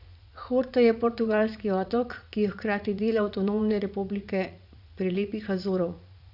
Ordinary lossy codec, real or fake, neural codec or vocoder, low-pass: none; fake; vocoder, 44.1 kHz, 80 mel bands, Vocos; 5.4 kHz